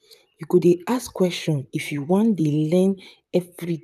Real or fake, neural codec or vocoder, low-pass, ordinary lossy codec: fake; vocoder, 44.1 kHz, 128 mel bands, Pupu-Vocoder; 14.4 kHz; none